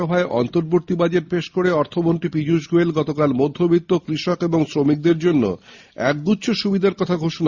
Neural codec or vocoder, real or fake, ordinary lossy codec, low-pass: none; real; Opus, 64 kbps; 7.2 kHz